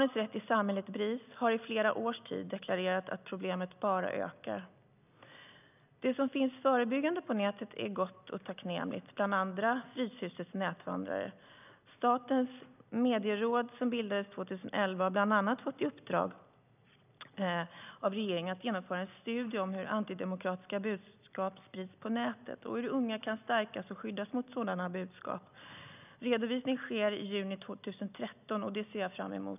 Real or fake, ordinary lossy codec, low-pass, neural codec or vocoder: real; none; 3.6 kHz; none